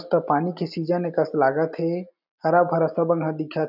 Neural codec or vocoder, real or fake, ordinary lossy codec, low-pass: none; real; none; 5.4 kHz